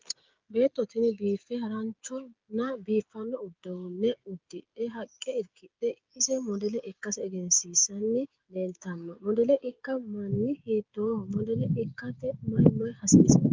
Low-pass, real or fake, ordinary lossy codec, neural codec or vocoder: 7.2 kHz; fake; Opus, 32 kbps; codec, 16 kHz, 16 kbps, FreqCodec, smaller model